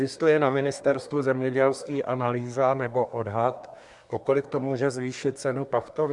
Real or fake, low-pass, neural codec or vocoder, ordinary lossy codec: fake; 10.8 kHz; codec, 24 kHz, 1 kbps, SNAC; MP3, 96 kbps